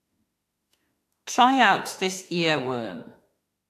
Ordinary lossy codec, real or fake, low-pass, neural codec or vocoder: AAC, 64 kbps; fake; 14.4 kHz; autoencoder, 48 kHz, 32 numbers a frame, DAC-VAE, trained on Japanese speech